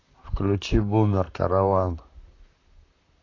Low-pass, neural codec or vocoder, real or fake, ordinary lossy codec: 7.2 kHz; vocoder, 44.1 kHz, 128 mel bands, Pupu-Vocoder; fake; AAC, 32 kbps